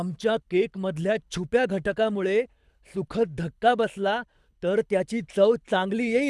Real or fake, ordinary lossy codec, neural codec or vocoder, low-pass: fake; none; codec, 24 kHz, 6 kbps, HILCodec; none